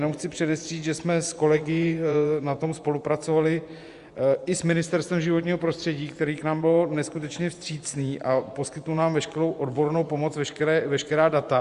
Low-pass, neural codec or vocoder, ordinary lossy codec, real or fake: 10.8 kHz; vocoder, 24 kHz, 100 mel bands, Vocos; Opus, 64 kbps; fake